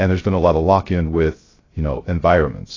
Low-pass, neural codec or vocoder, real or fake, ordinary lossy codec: 7.2 kHz; codec, 16 kHz, 0.3 kbps, FocalCodec; fake; AAC, 32 kbps